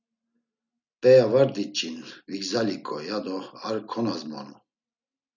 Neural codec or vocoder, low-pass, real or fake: none; 7.2 kHz; real